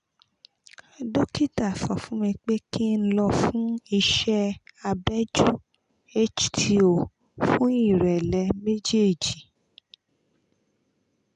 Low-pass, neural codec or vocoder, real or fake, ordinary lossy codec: 10.8 kHz; none; real; none